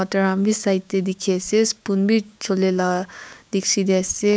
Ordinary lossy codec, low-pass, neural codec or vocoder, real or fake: none; none; codec, 16 kHz, 6 kbps, DAC; fake